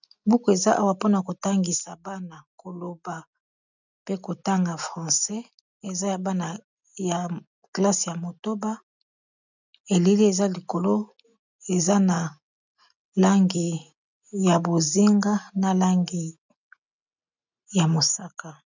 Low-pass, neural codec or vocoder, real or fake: 7.2 kHz; none; real